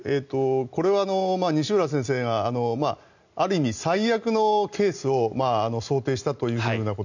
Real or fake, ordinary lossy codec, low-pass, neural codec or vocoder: real; none; 7.2 kHz; none